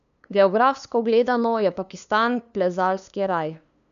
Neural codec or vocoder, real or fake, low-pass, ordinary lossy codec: codec, 16 kHz, 2 kbps, FunCodec, trained on LibriTTS, 25 frames a second; fake; 7.2 kHz; none